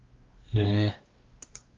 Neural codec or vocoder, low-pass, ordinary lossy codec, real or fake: codec, 16 kHz, 1 kbps, X-Codec, WavLM features, trained on Multilingual LibriSpeech; 7.2 kHz; Opus, 32 kbps; fake